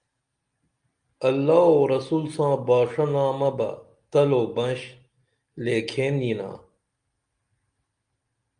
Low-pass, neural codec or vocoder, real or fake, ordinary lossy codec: 9.9 kHz; none; real; Opus, 32 kbps